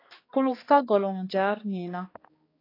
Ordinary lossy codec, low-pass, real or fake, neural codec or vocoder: AAC, 24 kbps; 5.4 kHz; fake; codec, 16 kHz, 2 kbps, X-Codec, HuBERT features, trained on balanced general audio